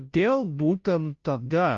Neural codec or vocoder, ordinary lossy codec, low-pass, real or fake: codec, 16 kHz, 0.5 kbps, FunCodec, trained on Chinese and English, 25 frames a second; Opus, 32 kbps; 7.2 kHz; fake